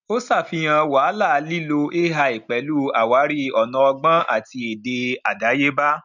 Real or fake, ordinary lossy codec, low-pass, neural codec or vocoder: real; none; 7.2 kHz; none